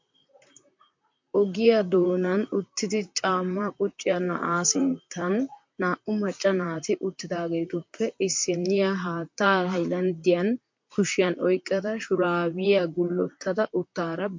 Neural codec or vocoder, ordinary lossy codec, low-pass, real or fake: vocoder, 44.1 kHz, 128 mel bands, Pupu-Vocoder; MP3, 48 kbps; 7.2 kHz; fake